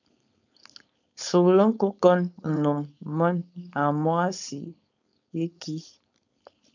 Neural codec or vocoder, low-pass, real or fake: codec, 16 kHz, 4.8 kbps, FACodec; 7.2 kHz; fake